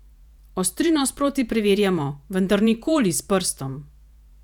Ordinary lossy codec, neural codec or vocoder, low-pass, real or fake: none; vocoder, 48 kHz, 128 mel bands, Vocos; 19.8 kHz; fake